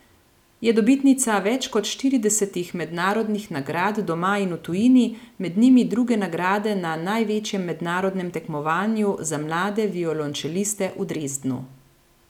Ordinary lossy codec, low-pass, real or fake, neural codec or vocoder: none; 19.8 kHz; real; none